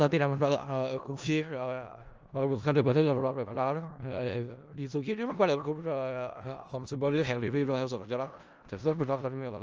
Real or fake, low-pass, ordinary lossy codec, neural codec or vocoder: fake; 7.2 kHz; Opus, 24 kbps; codec, 16 kHz in and 24 kHz out, 0.4 kbps, LongCat-Audio-Codec, four codebook decoder